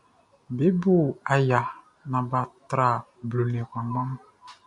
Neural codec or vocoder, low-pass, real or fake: none; 10.8 kHz; real